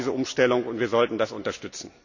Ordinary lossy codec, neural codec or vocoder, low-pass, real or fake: none; none; 7.2 kHz; real